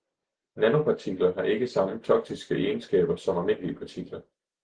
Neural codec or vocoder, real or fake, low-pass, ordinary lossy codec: none; real; 9.9 kHz; Opus, 16 kbps